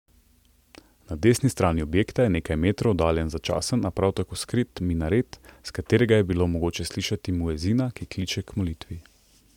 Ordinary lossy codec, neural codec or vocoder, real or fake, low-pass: MP3, 96 kbps; none; real; 19.8 kHz